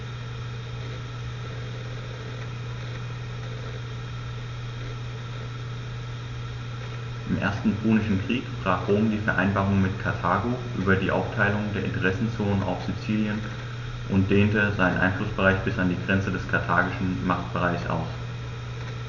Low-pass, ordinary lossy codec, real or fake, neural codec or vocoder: 7.2 kHz; none; real; none